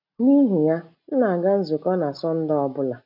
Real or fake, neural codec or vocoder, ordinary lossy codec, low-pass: real; none; none; 5.4 kHz